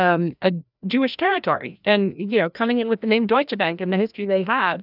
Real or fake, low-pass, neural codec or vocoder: fake; 5.4 kHz; codec, 16 kHz, 1 kbps, FreqCodec, larger model